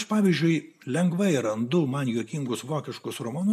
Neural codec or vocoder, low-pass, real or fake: none; 14.4 kHz; real